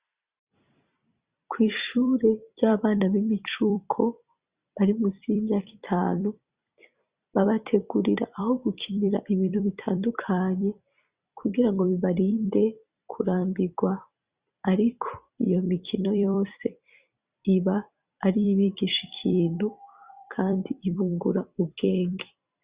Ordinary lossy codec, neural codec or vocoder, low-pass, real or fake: Opus, 64 kbps; vocoder, 44.1 kHz, 128 mel bands every 256 samples, BigVGAN v2; 3.6 kHz; fake